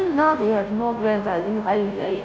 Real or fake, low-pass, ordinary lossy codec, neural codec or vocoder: fake; none; none; codec, 16 kHz, 0.5 kbps, FunCodec, trained on Chinese and English, 25 frames a second